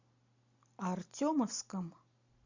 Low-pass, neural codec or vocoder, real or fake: 7.2 kHz; none; real